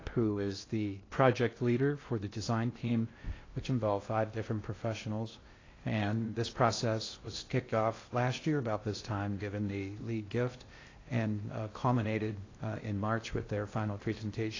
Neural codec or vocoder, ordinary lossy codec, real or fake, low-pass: codec, 16 kHz in and 24 kHz out, 0.6 kbps, FocalCodec, streaming, 2048 codes; AAC, 32 kbps; fake; 7.2 kHz